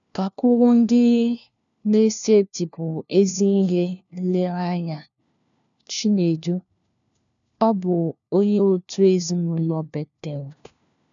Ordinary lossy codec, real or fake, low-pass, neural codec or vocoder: none; fake; 7.2 kHz; codec, 16 kHz, 1 kbps, FunCodec, trained on LibriTTS, 50 frames a second